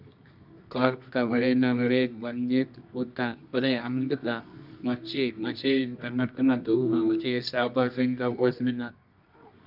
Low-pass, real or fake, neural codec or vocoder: 5.4 kHz; fake; codec, 24 kHz, 0.9 kbps, WavTokenizer, medium music audio release